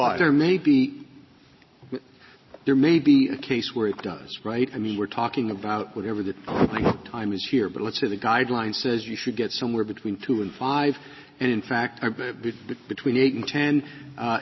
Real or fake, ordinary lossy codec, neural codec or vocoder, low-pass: real; MP3, 24 kbps; none; 7.2 kHz